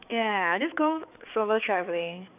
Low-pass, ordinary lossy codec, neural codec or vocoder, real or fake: 3.6 kHz; none; codec, 16 kHz, 4 kbps, X-Codec, HuBERT features, trained on balanced general audio; fake